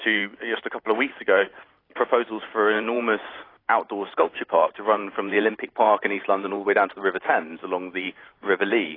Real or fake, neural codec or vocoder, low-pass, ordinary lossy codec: fake; vocoder, 44.1 kHz, 128 mel bands every 512 samples, BigVGAN v2; 5.4 kHz; AAC, 24 kbps